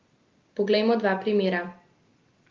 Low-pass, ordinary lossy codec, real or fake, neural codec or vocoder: 7.2 kHz; Opus, 32 kbps; real; none